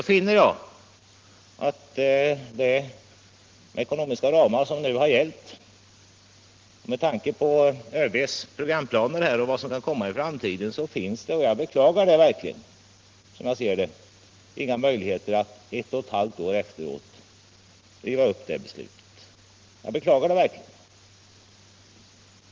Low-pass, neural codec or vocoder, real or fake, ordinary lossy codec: 7.2 kHz; none; real; Opus, 16 kbps